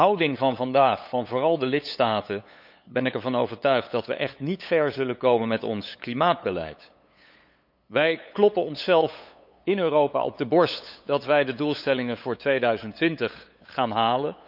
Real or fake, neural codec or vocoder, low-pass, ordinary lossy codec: fake; codec, 16 kHz, 8 kbps, FunCodec, trained on LibriTTS, 25 frames a second; 5.4 kHz; none